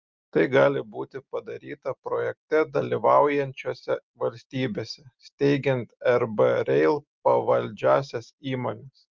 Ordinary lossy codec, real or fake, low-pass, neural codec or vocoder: Opus, 24 kbps; real; 7.2 kHz; none